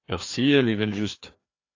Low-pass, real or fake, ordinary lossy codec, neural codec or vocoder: 7.2 kHz; fake; AAC, 48 kbps; codec, 16 kHz, 2 kbps, FreqCodec, larger model